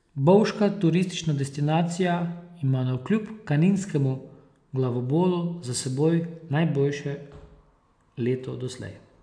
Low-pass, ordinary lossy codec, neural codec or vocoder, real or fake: 9.9 kHz; AAC, 64 kbps; none; real